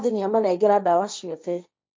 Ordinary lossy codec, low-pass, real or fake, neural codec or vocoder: none; none; fake; codec, 16 kHz, 1.1 kbps, Voila-Tokenizer